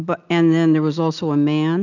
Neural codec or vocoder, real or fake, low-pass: none; real; 7.2 kHz